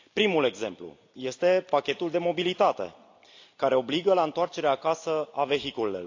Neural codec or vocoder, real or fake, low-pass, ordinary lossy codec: none; real; 7.2 kHz; AAC, 48 kbps